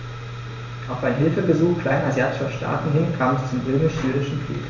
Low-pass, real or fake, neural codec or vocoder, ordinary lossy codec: 7.2 kHz; real; none; none